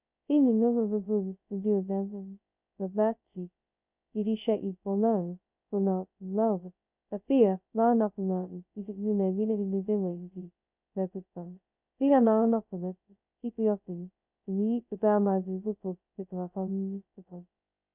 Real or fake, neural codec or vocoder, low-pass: fake; codec, 16 kHz, 0.2 kbps, FocalCodec; 3.6 kHz